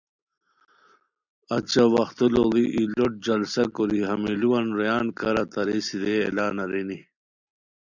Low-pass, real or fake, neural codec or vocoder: 7.2 kHz; real; none